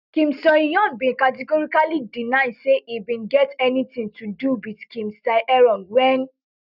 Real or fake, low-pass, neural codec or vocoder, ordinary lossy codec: real; 5.4 kHz; none; none